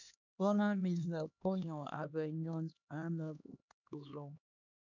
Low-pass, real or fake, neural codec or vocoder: 7.2 kHz; fake; codec, 16 kHz, 2 kbps, X-Codec, HuBERT features, trained on LibriSpeech